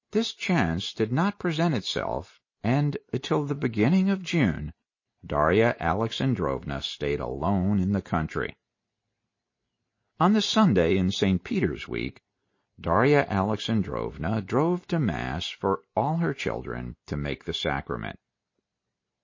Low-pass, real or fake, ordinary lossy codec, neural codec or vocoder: 7.2 kHz; real; MP3, 32 kbps; none